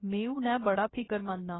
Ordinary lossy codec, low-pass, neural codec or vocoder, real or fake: AAC, 16 kbps; 7.2 kHz; codec, 16 kHz, about 1 kbps, DyCAST, with the encoder's durations; fake